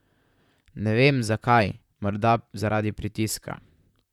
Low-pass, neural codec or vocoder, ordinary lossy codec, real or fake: 19.8 kHz; vocoder, 44.1 kHz, 128 mel bands, Pupu-Vocoder; none; fake